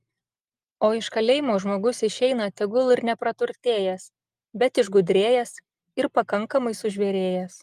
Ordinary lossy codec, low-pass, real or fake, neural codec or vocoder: Opus, 32 kbps; 14.4 kHz; real; none